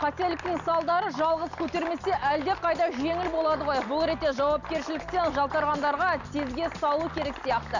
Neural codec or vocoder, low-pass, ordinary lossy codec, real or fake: none; 7.2 kHz; none; real